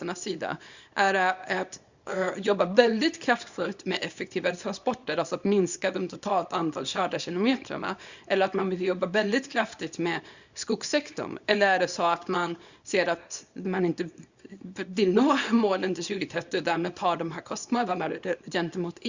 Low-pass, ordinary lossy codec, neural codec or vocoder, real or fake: 7.2 kHz; Opus, 64 kbps; codec, 24 kHz, 0.9 kbps, WavTokenizer, small release; fake